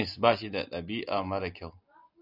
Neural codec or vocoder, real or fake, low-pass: none; real; 5.4 kHz